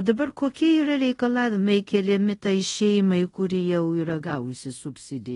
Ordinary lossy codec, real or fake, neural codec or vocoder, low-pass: AAC, 32 kbps; fake; codec, 24 kHz, 0.5 kbps, DualCodec; 10.8 kHz